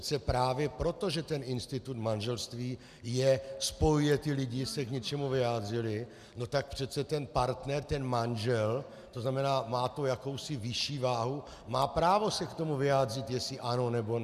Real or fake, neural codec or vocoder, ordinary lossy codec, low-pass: real; none; MP3, 96 kbps; 14.4 kHz